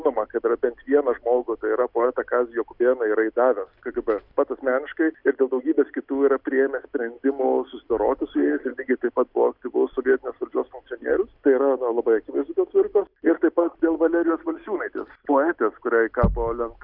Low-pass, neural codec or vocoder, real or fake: 5.4 kHz; none; real